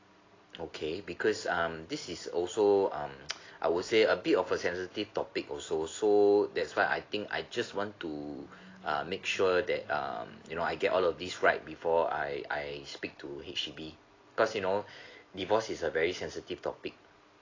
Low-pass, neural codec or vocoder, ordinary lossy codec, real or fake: 7.2 kHz; none; AAC, 32 kbps; real